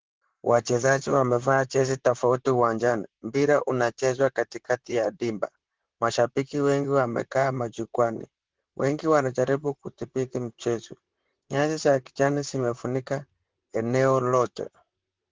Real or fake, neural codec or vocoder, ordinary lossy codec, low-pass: fake; vocoder, 44.1 kHz, 128 mel bands, Pupu-Vocoder; Opus, 16 kbps; 7.2 kHz